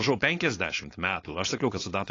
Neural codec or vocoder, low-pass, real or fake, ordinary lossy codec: codec, 16 kHz, 4.8 kbps, FACodec; 7.2 kHz; fake; AAC, 32 kbps